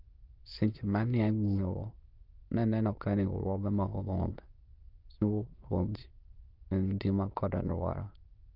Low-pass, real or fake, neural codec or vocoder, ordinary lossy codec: 5.4 kHz; fake; autoencoder, 22.05 kHz, a latent of 192 numbers a frame, VITS, trained on many speakers; Opus, 24 kbps